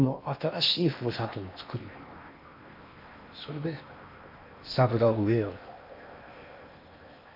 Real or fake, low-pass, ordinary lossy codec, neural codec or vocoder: fake; 5.4 kHz; none; codec, 16 kHz in and 24 kHz out, 0.8 kbps, FocalCodec, streaming, 65536 codes